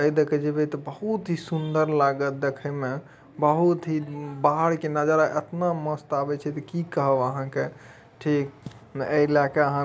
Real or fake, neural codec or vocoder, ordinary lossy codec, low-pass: real; none; none; none